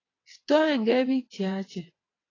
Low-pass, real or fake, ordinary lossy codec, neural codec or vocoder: 7.2 kHz; fake; AAC, 32 kbps; vocoder, 22.05 kHz, 80 mel bands, WaveNeXt